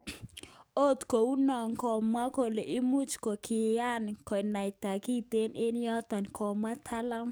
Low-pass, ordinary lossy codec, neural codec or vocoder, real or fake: none; none; codec, 44.1 kHz, 7.8 kbps, DAC; fake